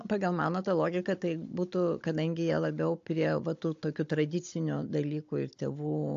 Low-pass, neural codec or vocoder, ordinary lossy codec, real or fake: 7.2 kHz; codec, 16 kHz, 16 kbps, FunCodec, trained on Chinese and English, 50 frames a second; AAC, 48 kbps; fake